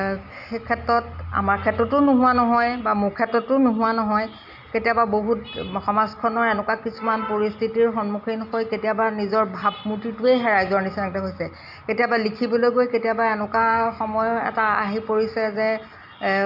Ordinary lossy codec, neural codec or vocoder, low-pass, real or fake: none; none; 5.4 kHz; real